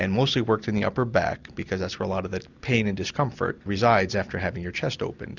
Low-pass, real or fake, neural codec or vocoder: 7.2 kHz; real; none